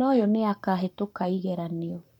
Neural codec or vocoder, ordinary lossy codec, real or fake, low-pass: codec, 44.1 kHz, 7.8 kbps, Pupu-Codec; none; fake; 19.8 kHz